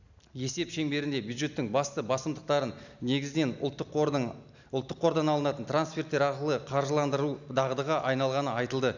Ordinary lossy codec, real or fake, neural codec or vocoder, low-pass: none; real; none; 7.2 kHz